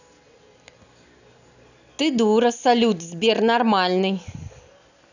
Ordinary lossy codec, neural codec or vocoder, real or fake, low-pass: none; none; real; 7.2 kHz